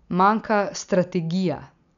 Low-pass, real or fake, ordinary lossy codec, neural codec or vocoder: 7.2 kHz; real; none; none